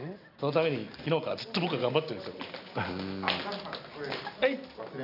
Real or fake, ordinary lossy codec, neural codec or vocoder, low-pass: real; none; none; 5.4 kHz